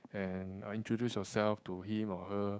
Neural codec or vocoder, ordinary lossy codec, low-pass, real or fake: codec, 16 kHz, 6 kbps, DAC; none; none; fake